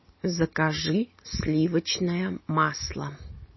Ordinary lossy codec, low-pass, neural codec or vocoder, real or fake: MP3, 24 kbps; 7.2 kHz; none; real